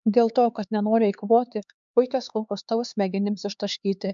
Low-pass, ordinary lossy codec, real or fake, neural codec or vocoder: 7.2 kHz; MP3, 96 kbps; fake; codec, 16 kHz, 4 kbps, X-Codec, HuBERT features, trained on LibriSpeech